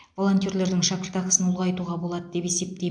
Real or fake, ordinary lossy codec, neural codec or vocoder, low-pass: real; AAC, 64 kbps; none; 9.9 kHz